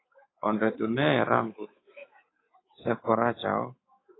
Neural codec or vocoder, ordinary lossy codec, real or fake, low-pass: codec, 24 kHz, 3.1 kbps, DualCodec; AAC, 16 kbps; fake; 7.2 kHz